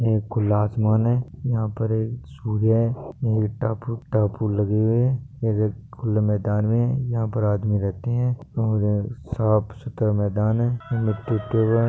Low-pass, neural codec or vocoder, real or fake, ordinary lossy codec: none; none; real; none